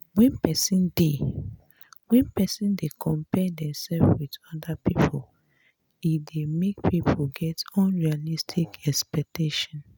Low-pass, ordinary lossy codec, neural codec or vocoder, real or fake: none; none; none; real